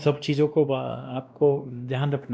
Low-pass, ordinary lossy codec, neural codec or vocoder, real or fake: none; none; codec, 16 kHz, 1 kbps, X-Codec, WavLM features, trained on Multilingual LibriSpeech; fake